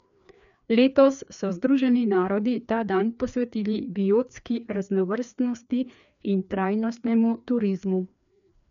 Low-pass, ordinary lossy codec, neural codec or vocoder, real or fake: 7.2 kHz; none; codec, 16 kHz, 2 kbps, FreqCodec, larger model; fake